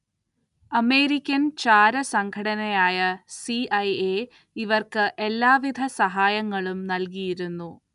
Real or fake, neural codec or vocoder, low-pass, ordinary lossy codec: real; none; 10.8 kHz; none